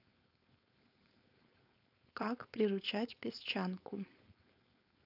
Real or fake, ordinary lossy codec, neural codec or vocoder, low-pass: fake; none; codec, 16 kHz, 4.8 kbps, FACodec; 5.4 kHz